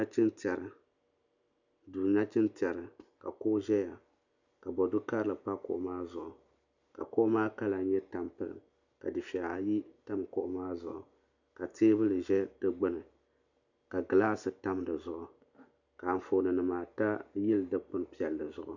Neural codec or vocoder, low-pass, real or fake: none; 7.2 kHz; real